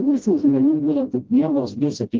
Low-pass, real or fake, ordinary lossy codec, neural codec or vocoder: 7.2 kHz; fake; Opus, 24 kbps; codec, 16 kHz, 0.5 kbps, FreqCodec, smaller model